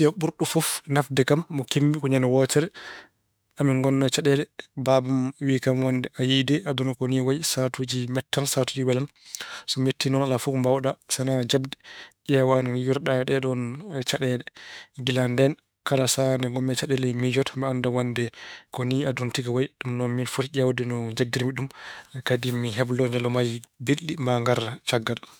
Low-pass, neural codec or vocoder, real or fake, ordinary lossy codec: none; autoencoder, 48 kHz, 32 numbers a frame, DAC-VAE, trained on Japanese speech; fake; none